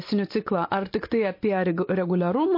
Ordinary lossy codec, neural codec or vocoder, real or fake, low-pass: MP3, 32 kbps; none; real; 5.4 kHz